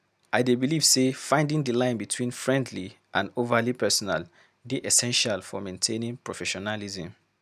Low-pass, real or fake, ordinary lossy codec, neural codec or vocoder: 14.4 kHz; real; none; none